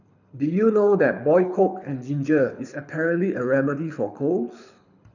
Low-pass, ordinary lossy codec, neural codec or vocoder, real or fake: 7.2 kHz; none; codec, 24 kHz, 6 kbps, HILCodec; fake